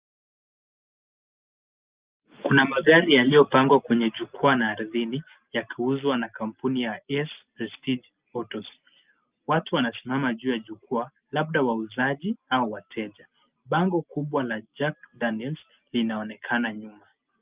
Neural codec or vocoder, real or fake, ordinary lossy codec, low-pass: none; real; Opus, 24 kbps; 3.6 kHz